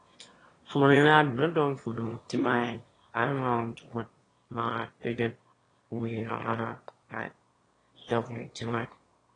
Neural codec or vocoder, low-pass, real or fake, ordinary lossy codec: autoencoder, 22.05 kHz, a latent of 192 numbers a frame, VITS, trained on one speaker; 9.9 kHz; fake; AAC, 32 kbps